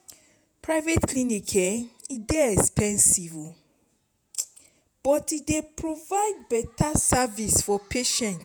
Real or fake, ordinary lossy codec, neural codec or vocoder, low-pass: fake; none; vocoder, 48 kHz, 128 mel bands, Vocos; none